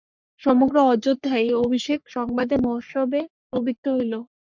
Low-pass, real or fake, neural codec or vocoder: 7.2 kHz; fake; codec, 44.1 kHz, 3.4 kbps, Pupu-Codec